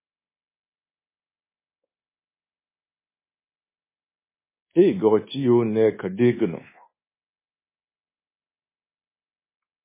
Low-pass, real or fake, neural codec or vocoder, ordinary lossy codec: 3.6 kHz; fake; codec, 24 kHz, 1.2 kbps, DualCodec; MP3, 16 kbps